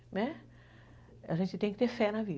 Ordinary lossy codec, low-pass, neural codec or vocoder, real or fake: none; none; none; real